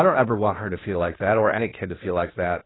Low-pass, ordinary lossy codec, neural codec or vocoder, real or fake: 7.2 kHz; AAC, 16 kbps; codec, 16 kHz, 0.8 kbps, ZipCodec; fake